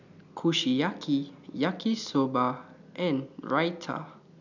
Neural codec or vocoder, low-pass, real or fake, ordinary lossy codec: none; 7.2 kHz; real; none